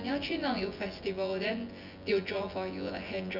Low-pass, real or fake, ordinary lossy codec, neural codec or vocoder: 5.4 kHz; fake; Opus, 64 kbps; vocoder, 24 kHz, 100 mel bands, Vocos